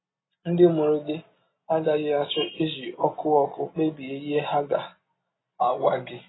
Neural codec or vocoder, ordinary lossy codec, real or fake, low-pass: none; AAC, 16 kbps; real; 7.2 kHz